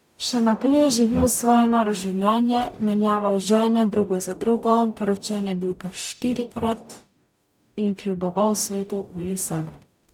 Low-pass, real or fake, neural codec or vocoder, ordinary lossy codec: 19.8 kHz; fake; codec, 44.1 kHz, 0.9 kbps, DAC; none